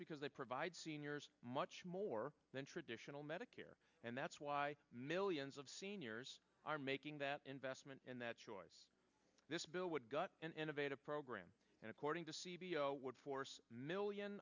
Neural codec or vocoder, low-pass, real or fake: none; 7.2 kHz; real